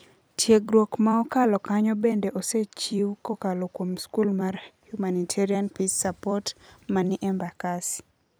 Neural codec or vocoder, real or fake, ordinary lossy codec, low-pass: vocoder, 44.1 kHz, 128 mel bands every 256 samples, BigVGAN v2; fake; none; none